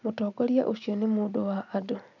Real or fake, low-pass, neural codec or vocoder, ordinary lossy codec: real; 7.2 kHz; none; none